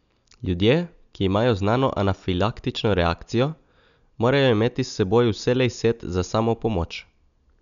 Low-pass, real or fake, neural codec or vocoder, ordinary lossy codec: 7.2 kHz; real; none; none